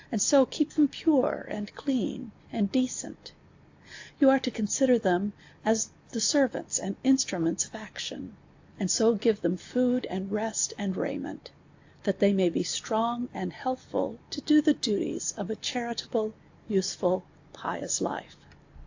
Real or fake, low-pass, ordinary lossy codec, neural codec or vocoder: real; 7.2 kHz; AAC, 48 kbps; none